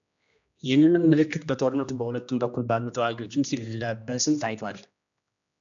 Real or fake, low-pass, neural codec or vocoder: fake; 7.2 kHz; codec, 16 kHz, 1 kbps, X-Codec, HuBERT features, trained on general audio